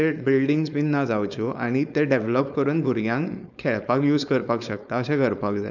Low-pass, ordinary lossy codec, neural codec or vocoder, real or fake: 7.2 kHz; none; codec, 16 kHz, 4.8 kbps, FACodec; fake